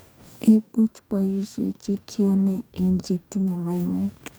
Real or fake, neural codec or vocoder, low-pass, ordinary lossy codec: fake; codec, 44.1 kHz, 2.6 kbps, DAC; none; none